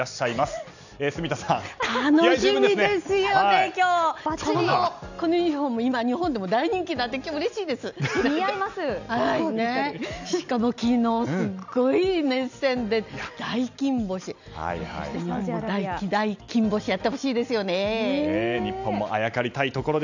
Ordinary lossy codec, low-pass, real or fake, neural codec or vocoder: none; 7.2 kHz; real; none